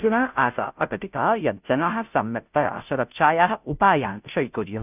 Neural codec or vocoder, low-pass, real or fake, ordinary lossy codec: codec, 16 kHz, 0.5 kbps, FunCodec, trained on Chinese and English, 25 frames a second; 3.6 kHz; fake; none